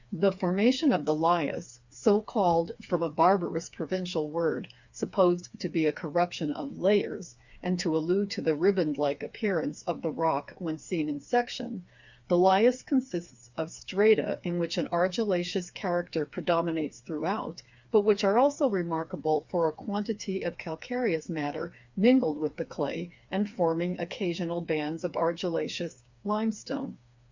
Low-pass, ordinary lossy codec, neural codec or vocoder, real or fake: 7.2 kHz; Opus, 64 kbps; codec, 16 kHz, 4 kbps, FreqCodec, smaller model; fake